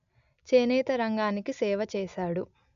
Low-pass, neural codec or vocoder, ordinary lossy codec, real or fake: 7.2 kHz; none; none; real